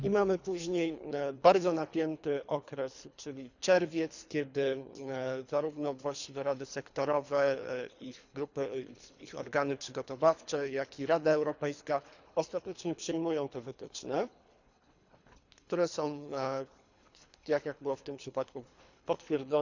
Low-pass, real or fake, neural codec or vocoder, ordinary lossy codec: 7.2 kHz; fake; codec, 24 kHz, 3 kbps, HILCodec; none